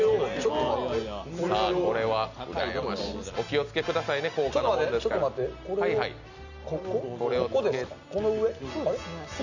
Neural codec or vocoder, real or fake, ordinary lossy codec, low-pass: none; real; none; 7.2 kHz